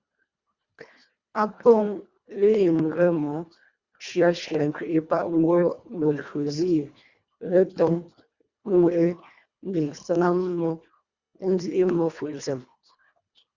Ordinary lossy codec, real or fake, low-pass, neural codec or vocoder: Opus, 64 kbps; fake; 7.2 kHz; codec, 24 kHz, 1.5 kbps, HILCodec